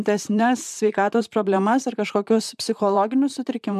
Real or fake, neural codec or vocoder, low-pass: fake; vocoder, 44.1 kHz, 128 mel bands, Pupu-Vocoder; 14.4 kHz